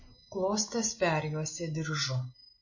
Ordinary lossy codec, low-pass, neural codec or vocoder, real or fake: MP3, 32 kbps; 7.2 kHz; none; real